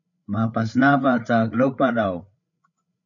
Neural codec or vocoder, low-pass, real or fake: codec, 16 kHz, 8 kbps, FreqCodec, larger model; 7.2 kHz; fake